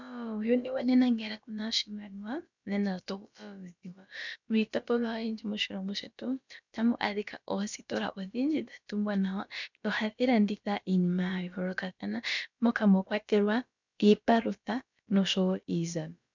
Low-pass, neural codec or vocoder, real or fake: 7.2 kHz; codec, 16 kHz, about 1 kbps, DyCAST, with the encoder's durations; fake